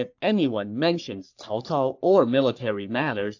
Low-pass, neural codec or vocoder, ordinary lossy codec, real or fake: 7.2 kHz; codec, 44.1 kHz, 3.4 kbps, Pupu-Codec; AAC, 48 kbps; fake